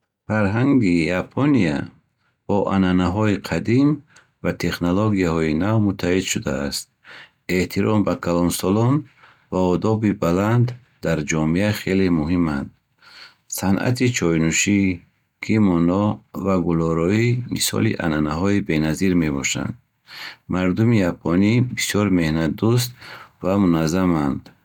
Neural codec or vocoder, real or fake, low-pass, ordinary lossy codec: none; real; 19.8 kHz; none